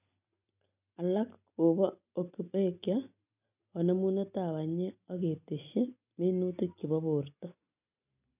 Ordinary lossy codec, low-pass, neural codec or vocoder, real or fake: none; 3.6 kHz; none; real